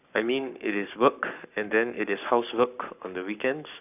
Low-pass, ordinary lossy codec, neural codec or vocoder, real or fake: 3.6 kHz; none; codec, 44.1 kHz, 7.8 kbps, Pupu-Codec; fake